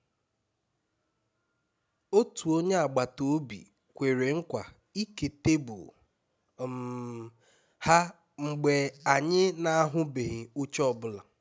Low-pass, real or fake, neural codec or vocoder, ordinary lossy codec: none; real; none; none